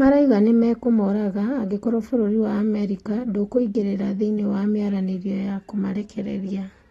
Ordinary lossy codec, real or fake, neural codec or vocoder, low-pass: AAC, 32 kbps; real; none; 19.8 kHz